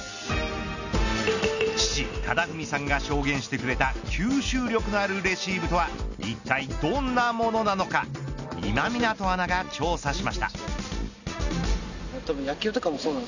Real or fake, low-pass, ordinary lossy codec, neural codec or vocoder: real; 7.2 kHz; none; none